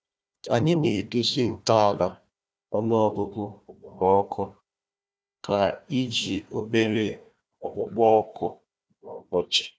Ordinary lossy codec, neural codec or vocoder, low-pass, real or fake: none; codec, 16 kHz, 1 kbps, FunCodec, trained on Chinese and English, 50 frames a second; none; fake